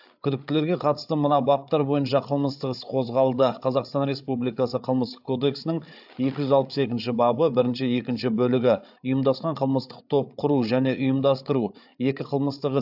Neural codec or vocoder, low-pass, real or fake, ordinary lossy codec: codec, 16 kHz, 8 kbps, FreqCodec, larger model; 5.4 kHz; fake; AAC, 48 kbps